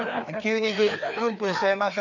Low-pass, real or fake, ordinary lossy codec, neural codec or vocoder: 7.2 kHz; fake; none; codec, 16 kHz, 2 kbps, FreqCodec, larger model